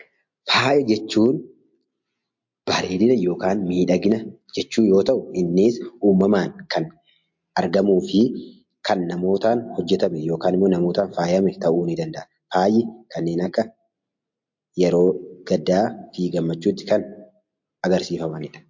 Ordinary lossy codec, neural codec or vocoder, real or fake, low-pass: MP3, 48 kbps; none; real; 7.2 kHz